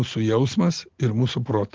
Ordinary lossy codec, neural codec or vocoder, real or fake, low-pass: Opus, 16 kbps; none; real; 7.2 kHz